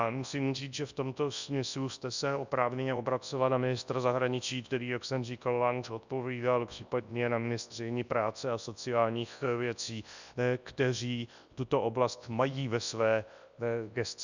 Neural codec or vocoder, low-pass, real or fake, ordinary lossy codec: codec, 24 kHz, 0.9 kbps, WavTokenizer, large speech release; 7.2 kHz; fake; Opus, 64 kbps